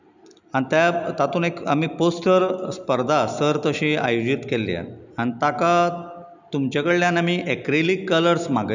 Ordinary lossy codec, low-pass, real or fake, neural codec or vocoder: none; 7.2 kHz; real; none